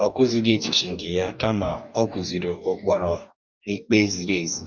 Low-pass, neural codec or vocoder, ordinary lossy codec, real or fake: 7.2 kHz; codec, 44.1 kHz, 2.6 kbps, DAC; none; fake